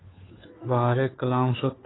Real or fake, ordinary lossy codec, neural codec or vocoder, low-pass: fake; AAC, 16 kbps; codec, 16 kHz, 2 kbps, FunCodec, trained on Chinese and English, 25 frames a second; 7.2 kHz